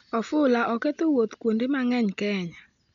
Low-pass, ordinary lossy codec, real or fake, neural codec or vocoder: 7.2 kHz; none; real; none